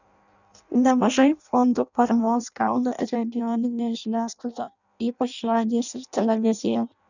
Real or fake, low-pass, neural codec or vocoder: fake; 7.2 kHz; codec, 16 kHz in and 24 kHz out, 0.6 kbps, FireRedTTS-2 codec